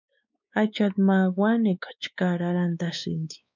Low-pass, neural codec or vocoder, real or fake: 7.2 kHz; codec, 16 kHz, 4 kbps, X-Codec, WavLM features, trained on Multilingual LibriSpeech; fake